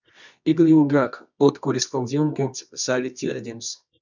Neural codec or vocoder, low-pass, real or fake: codec, 24 kHz, 0.9 kbps, WavTokenizer, medium music audio release; 7.2 kHz; fake